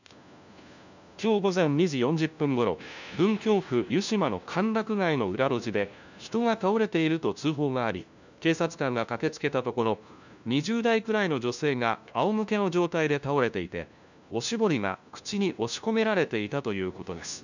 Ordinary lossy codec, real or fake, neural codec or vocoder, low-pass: none; fake; codec, 16 kHz, 1 kbps, FunCodec, trained on LibriTTS, 50 frames a second; 7.2 kHz